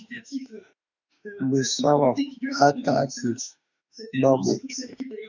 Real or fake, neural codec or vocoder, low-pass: fake; autoencoder, 48 kHz, 32 numbers a frame, DAC-VAE, trained on Japanese speech; 7.2 kHz